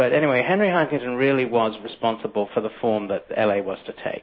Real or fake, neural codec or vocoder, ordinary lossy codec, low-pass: real; none; MP3, 24 kbps; 7.2 kHz